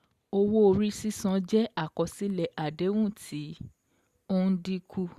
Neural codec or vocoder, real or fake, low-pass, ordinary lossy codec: none; real; 14.4 kHz; Opus, 64 kbps